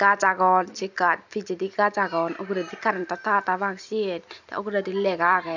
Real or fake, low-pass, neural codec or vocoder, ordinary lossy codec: real; 7.2 kHz; none; none